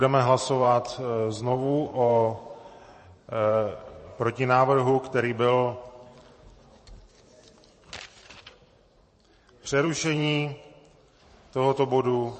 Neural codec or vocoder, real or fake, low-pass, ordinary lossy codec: vocoder, 44.1 kHz, 128 mel bands every 512 samples, BigVGAN v2; fake; 10.8 kHz; MP3, 32 kbps